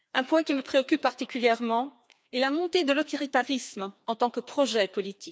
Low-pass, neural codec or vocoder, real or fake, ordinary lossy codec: none; codec, 16 kHz, 2 kbps, FreqCodec, larger model; fake; none